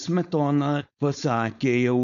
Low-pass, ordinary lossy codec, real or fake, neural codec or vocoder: 7.2 kHz; AAC, 96 kbps; fake; codec, 16 kHz, 4.8 kbps, FACodec